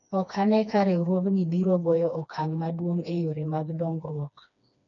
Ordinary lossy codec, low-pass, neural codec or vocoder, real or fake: none; 7.2 kHz; codec, 16 kHz, 2 kbps, FreqCodec, smaller model; fake